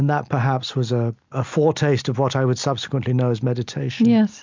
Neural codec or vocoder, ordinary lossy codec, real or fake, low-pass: none; MP3, 64 kbps; real; 7.2 kHz